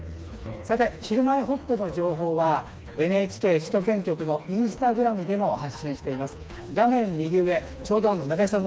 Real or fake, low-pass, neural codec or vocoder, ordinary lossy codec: fake; none; codec, 16 kHz, 2 kbps, FreqCodec, smaller model; none